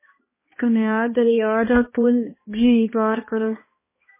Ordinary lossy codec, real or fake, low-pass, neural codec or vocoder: MP3, 16 kbps; fake; 3.6 kHz; codec, 16 kHz, 1 kbps, X-Codec, HuBERT features, trained on balanced general audio